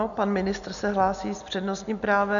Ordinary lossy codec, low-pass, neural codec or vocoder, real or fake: MP3, 96 kbps; 7.2 kHz; none; real